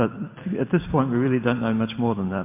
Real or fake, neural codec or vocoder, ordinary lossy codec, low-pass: real; none; MP3, 32 kbps; 3.6 kHz